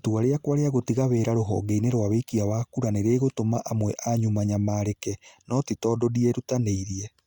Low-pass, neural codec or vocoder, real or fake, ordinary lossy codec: 19.8 kHz; none; real; Opus, 64 kbps